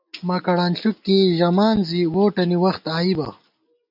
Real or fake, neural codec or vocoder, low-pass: real; none; 5.4 kHz